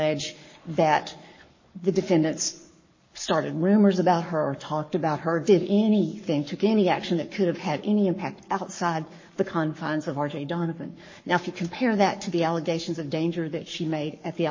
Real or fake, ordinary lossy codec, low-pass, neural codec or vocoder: fake; MP3, 32 kbps; 7.2 kHz; codec, 44.1 kHz, 7.8 kbps, Pupu-Codec